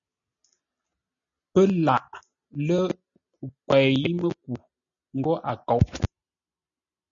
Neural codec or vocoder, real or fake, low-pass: none; real; 7.2 kHz